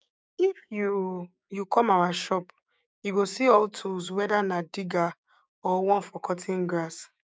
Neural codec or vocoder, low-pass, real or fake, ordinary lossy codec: codec, 16 kHz, 6 kbps, DAC; none; fake; none